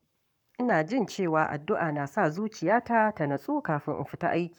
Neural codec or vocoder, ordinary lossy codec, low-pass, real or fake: codec, 44.1 kHz, 7.8 kbps, Pupu-Codec; none; 19.8 kHz; fake